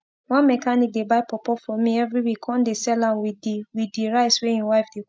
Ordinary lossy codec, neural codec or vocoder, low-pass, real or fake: none; none; none; real